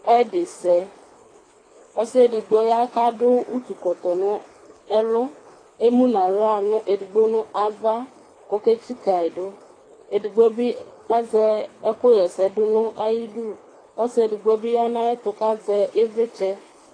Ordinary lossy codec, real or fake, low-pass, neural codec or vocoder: AAC, 32 kbps; fake; 9.9 kHz; codec, 24 kHz, 3 kbps, HILCodec